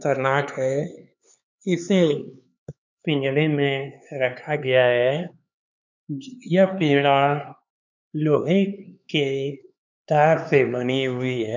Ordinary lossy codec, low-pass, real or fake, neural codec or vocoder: none; 7.2 kHz; fake; codec, 16 kHz, 4 kbps, X-Codec, HuBERT features, trained on LibriSpeech